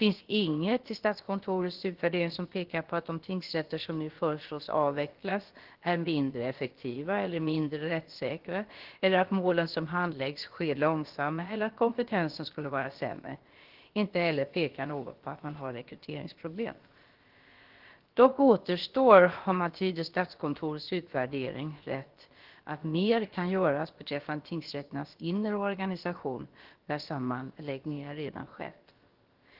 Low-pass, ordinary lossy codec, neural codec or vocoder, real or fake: 5.4 kHz; Opus, 16 kbps; codec, 16 kHz, about 1 kbps, DyCAST, with the encoder's durations; fake